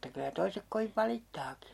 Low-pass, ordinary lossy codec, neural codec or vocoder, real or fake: 19.8 kHz; MP3, 64 kbps; none; real